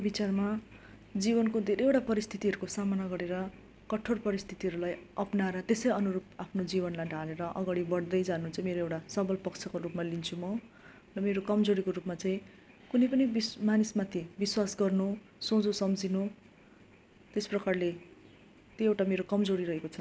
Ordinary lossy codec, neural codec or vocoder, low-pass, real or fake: none; none; none; real